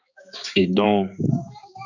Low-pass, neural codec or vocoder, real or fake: 7.2 kHz; codec, 16 kHz, 4 kbps, X-Codec, HuBERT features, trained on balanced general audio; fake